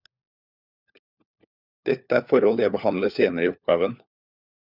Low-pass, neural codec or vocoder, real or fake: 5.4 kHz; codec, 16 kHz, 4 kbps, FunCodec, trained on LibriTTS, 50 frames a second; fake